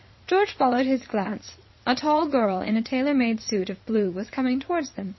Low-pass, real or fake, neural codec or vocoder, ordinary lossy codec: 7.2 kHz; fake; vocoder, 22.05 kHz, 80 mel bands, Vocos; MP3, 24 kbps